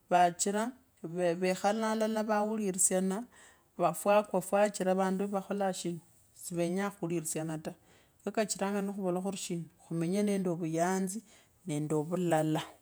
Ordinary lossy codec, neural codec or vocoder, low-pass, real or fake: none; vocoder, 48 kHz, 128 mel bands, Vocos; none; fake